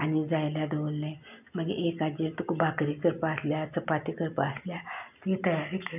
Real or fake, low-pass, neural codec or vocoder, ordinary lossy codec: real; 3.6 kHz; none; none